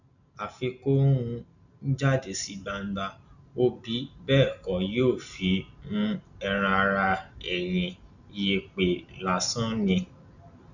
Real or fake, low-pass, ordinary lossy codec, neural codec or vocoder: real; 7.2 kHz; none; none